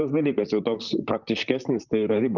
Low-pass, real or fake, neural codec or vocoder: 7.2 kHz; fake; vocoder, 44.1 kHz, 128 mel bands, Pupu-Vocoder